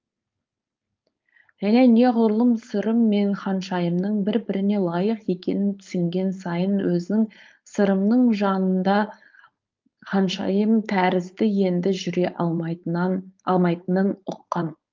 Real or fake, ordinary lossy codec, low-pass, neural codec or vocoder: fake; Opus, 24 kbps; 7.2 kHz; codec, 16 kHz, 4.8 kbps, FACodec